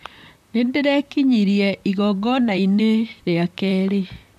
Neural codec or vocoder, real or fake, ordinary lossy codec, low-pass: vocoder, 44.1 kHz, 128 mel bands, Pupu-Vocoder; fake; none; 14.4 kHz